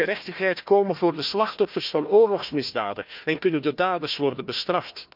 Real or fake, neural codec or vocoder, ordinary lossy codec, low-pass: fake; codec, 16 kHz, 1 kbps, FunCodec, trained on Chinese and English, 50 frames a second; none; 5.4 kHz